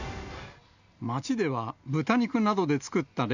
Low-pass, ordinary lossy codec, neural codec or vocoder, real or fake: 7.2 kHz; none; none; real